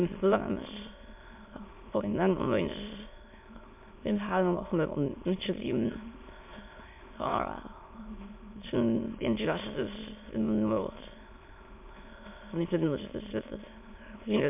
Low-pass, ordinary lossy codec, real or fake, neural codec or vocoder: 3.6 kHz; MP3, 32 kbps; fake; autoencoder, 22.05 kHz, a latent of 192 numbers a frame, VITS, trained on many speakers